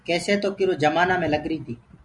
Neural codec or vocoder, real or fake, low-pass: none; real; 10.8 kHz